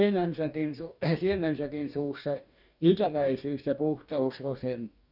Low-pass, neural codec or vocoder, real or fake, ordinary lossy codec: 5.4 kHz; codec, 44.1 kHz, 2.6 kbps, DAC; fake; none